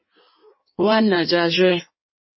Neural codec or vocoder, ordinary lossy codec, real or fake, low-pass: codec, 16 kHz in and 24 kHz out, 1.1 kbps, FireRedTTS-2 codec; MP3, 24 kbps; fake; 7.2 kHz